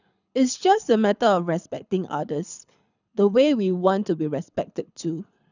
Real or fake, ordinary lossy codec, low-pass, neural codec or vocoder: fake; none; 7.2 kHz; codec, 24 kHz, 6 kbps, HILCodec